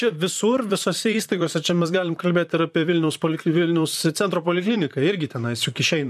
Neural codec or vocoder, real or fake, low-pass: vocoder, 44.1 kHz, 128 mel bands, Pupu-Vocoder; fake; 14.4 kHz